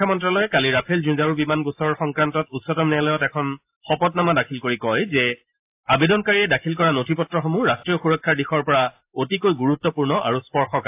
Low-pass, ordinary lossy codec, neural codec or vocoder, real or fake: 3.6 kHz; AAC, 32 kbps; none; real